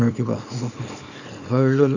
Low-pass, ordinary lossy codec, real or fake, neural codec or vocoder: 7.2 kHz; none; fake; codec, 24 kHz, 0.9 kbps, WavTokenizer, small release